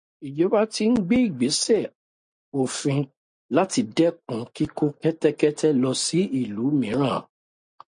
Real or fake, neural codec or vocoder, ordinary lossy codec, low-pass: real; none; MP3, 48 kbps; 9.9 kHz